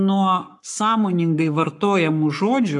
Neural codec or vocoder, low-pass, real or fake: codec, 44.1 kHz, 7.8 kbps, Pupu-Codec; 10.8 kHz; fake